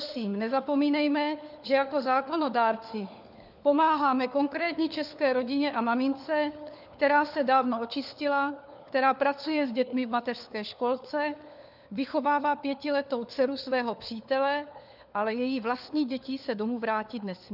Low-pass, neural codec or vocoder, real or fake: 5.4 kHz; codec, 16 kHz, 4 kbps, FunCodec, trained on LibriTTS, 50 frames a second; fake